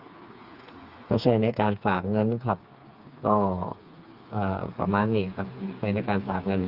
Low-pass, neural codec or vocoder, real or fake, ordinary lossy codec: 5.4 kHz; codec, 16 kHz, 4 kbps, FreqCodec, smaller model; fake; Opus, 24 kbps